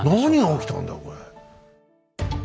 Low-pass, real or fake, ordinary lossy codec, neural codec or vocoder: none; real; none; none